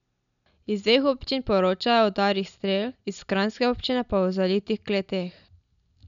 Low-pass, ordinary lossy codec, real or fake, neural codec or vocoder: 7.2 kHz; none; real; none